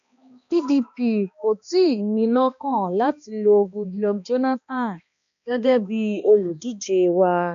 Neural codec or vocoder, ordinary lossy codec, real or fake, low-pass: codec, 16 kHz, 2 kbps, X-Codec, HuBERT features, trained on balanced general audio; none; fake; 7.2 kHz